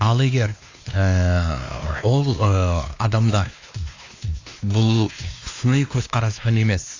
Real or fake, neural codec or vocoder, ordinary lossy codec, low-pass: fake; codec, 16 kHz, 2 kbps, X-Codec, WavLM features, trained on Multilingual LibriSpeech; AAC, 48 kbps; 7.2 kHz